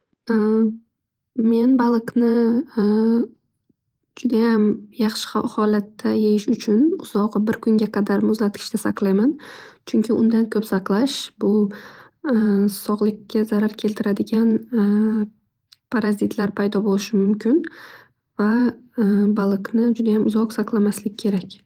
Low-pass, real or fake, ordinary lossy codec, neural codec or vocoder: 19.8 kHz; fake; Opus, 24 kbps; vocoder, 44.1 kHz, 128 mel bands every 512 samples, BigVGAN v2